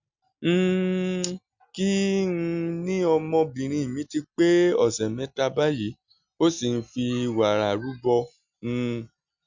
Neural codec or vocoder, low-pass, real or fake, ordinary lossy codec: none; 7.2 kHz; real; Opus, 64 kbps